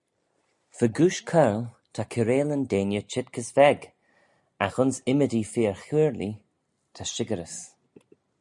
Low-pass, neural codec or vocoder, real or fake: 10.8 kHz; none; real